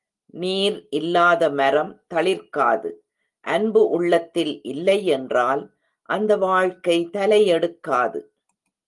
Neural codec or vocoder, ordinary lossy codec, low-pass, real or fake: none; Opus, 24 kbps; 10.8 kHz; real